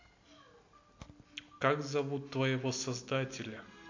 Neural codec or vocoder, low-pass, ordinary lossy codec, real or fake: none; 7.2 kHz; MP3, 48 kbps; real